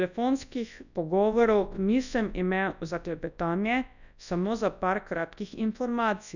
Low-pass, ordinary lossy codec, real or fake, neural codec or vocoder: 7.2 kHz; none; fake; codec, 24 kHz, 0.9 kbps, WavTokenizer, large speech release